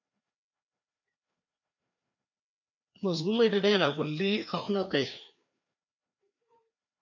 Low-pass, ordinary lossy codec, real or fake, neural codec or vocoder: 7.2 kHz; MP3, 64 kbps; fake; codec, 16 kHz, 2 kbps, FreqCodec, larger model